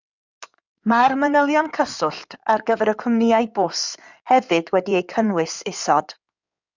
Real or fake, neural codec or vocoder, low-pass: fake; codec, 16 kHz in and 24 kHz out, 2.2 kbps, FireRedTTS-2 codec; 7.2 kHz